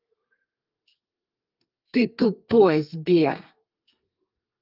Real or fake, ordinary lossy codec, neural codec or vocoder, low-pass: fake; Opus, 24 kbps; codec, 32 kHz, 1.9 kbps, SNAC; 5.4 kHz